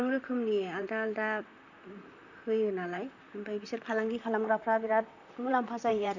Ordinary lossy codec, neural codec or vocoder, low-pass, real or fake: none; vocoder, 44.1 kHz, 128 mel bands, Pupu-Vocoder; 7.2 kHz; fake